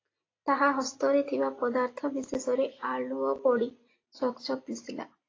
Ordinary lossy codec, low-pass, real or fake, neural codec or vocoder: AAC, 32 kbps; 7.2 kHz; real; none